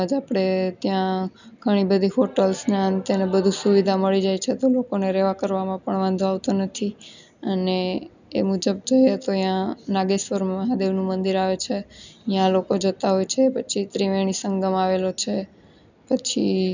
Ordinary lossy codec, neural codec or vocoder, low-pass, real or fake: none; none; 7.2 kHz; real